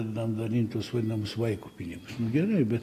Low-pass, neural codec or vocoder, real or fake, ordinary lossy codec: 14.4 kHz; none; real; AAC, 48 kbps